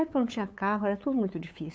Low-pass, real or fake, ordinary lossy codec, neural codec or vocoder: none; fake; none; codec, 16 kHz, 8 kbps, FunCodec, trained on LibriTTS, 25 frames a second